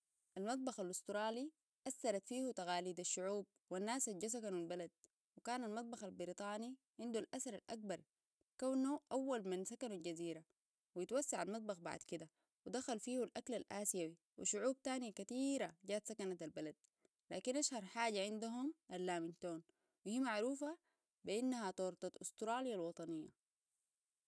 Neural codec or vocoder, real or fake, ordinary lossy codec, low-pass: none; real; none; none